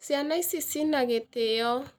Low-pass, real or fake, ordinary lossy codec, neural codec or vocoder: none; real; none; none